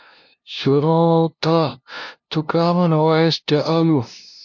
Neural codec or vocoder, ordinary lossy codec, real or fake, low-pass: codec, 16 kHz, 0.5 kbps, FunCodec, trained on LibriTTS, 25 frames a second; MP3, 48 kbps; fake; 7.2 kHz